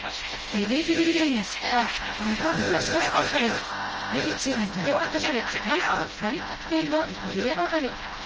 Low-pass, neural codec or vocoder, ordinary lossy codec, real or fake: 7.2 kHz; codec, 16 kHz, 0.5 kbps, FreqCodec, smaller model; Opus, 24 kbps; fake